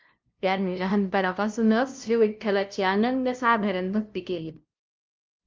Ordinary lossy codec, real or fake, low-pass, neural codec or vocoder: Opus, 24 kbps; fake; 7.2 kHz; codec, 16 kHz, 0.5 kbps, FunCodec, trained on LibriTTS, 25 frames a second